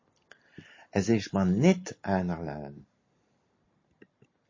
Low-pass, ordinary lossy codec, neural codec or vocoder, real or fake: 7.2 kHz; MP3, 32 kbps; none; real